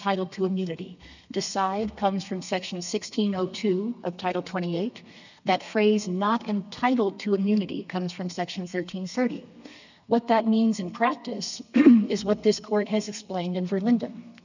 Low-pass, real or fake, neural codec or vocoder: 7.2 kHz; fake; codec, 32 kHz, 1.9 kbps, SNAC